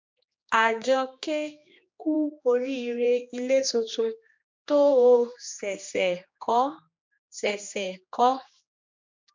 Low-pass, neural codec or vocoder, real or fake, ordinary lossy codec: 7.2 kHz; codec, 16 kHz, 2 kbps, X-Codec, HuBERT features, trained on general audio; fake; MP3, 64 kbps